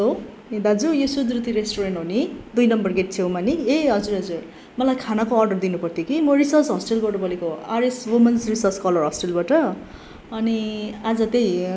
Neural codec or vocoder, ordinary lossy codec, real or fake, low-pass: none; none; real; none